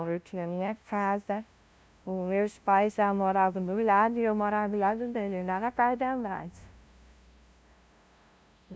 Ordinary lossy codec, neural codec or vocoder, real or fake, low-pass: none; codec, 16 kHz, 0.5 kbps, FunCodec, trained on LibriTTS, 25 frames a second; fake; none